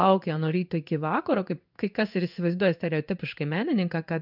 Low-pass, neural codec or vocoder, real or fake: 5.4 kHz; codec, 16 kHz in and 24 kHz out, 1 kbps, XY-Tokenizer; fake